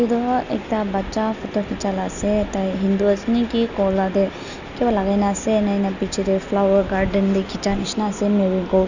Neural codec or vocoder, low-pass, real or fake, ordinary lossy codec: none; 7.2 kHz; real; none